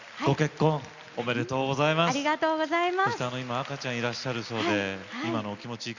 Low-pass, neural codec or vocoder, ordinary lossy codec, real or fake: 7.2 kHz; none; Opus, 64 kbps; real